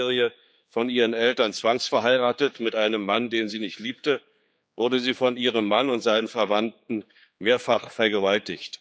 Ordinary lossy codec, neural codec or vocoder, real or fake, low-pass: none; codec, 16 kHz, 4 kbps, X-Codec, HuBERT features, trained on general audio; fake; none